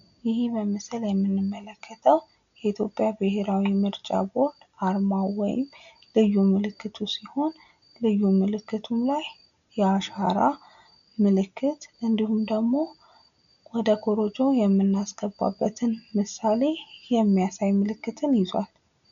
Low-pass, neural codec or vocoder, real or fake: 7.2 kHz; none; real